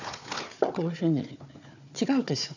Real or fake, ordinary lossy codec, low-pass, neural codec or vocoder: fake; none; 7.2 kHz; codec, 16 kHz, 4 kbps, FunCodec, trained on Chinese and English, 50 frames a second